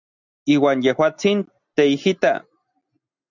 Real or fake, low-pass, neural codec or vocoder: real; 7.2 kHz; none